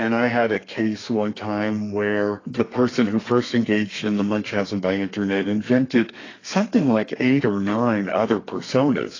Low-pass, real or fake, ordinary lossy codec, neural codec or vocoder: 7.2 kHz; fake; AAC, 32 kbps; codec, 32 kHz, 1.9 kbps, SNAC